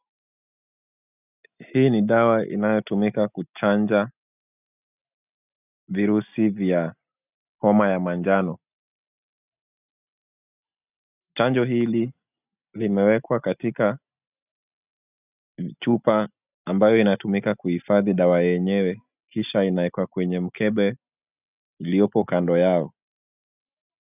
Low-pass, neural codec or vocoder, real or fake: 3.6 kHz; none; real